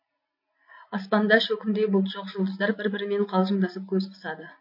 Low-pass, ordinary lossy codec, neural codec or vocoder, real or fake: 5.4 kHz; MP3, 32 kbps; none; real